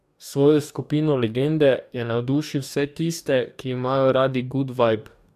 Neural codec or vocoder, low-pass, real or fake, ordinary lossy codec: codec, 44.1 kHz, 2.6 kbps, DAC; 14.4 kHz; fake; AAC, 96 kbps